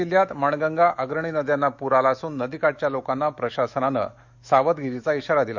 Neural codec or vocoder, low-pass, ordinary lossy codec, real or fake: autoencoder, 48 kHz, 128 numbers a frame, DAC-VAE, trained on Japanese speech; 7.2 kHz; Opus, 64 kbps; fake